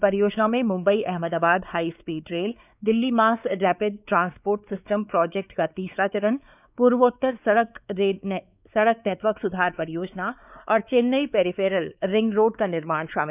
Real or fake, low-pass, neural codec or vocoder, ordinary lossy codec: fake; 3.6 kHz; codec, 16 kHz, 4 kbps, X-Codec, WavLM features, trained on Multilingual LibriSpeech; none